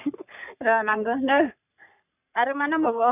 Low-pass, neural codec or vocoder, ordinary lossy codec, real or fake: 3.6 kHz; codec, 44.1 kHz, 3.4 kbps, Pupu-Codec; none; fake